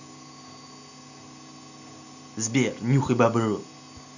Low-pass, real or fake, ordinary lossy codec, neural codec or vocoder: 7.2 kHz; real; none; none